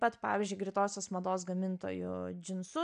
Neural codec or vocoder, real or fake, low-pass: none; real; 9.9 kHz